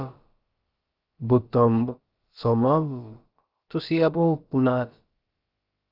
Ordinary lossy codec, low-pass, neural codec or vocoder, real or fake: Opus, 24 kbps; 5.4 kHz; codec, 16 kHz, about 1 kbps, DyCAST, with the encoder's durations; fake